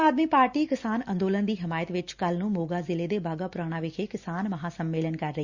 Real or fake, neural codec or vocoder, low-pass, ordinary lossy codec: real; none; 7.2 kHz; Opus, 64 kbps